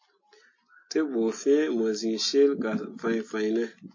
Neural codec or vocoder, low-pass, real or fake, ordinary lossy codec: none; 7.2 kHz; real; MP3, 32 kbps